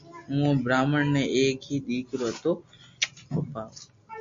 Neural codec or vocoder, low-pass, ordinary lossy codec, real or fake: none; 7.2 kHz; MP3, 48 kbps; real